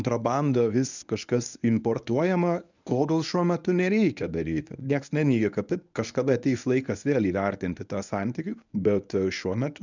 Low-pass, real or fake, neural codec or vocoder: 7.2 kHz; fake; codec, 24 kHz, 0.9 kbps, WavTokenizer, medium speech release version 1